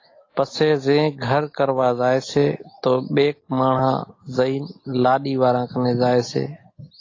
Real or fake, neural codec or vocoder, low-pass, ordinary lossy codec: real; none; 7.2 kHz; AAC, 32 kbps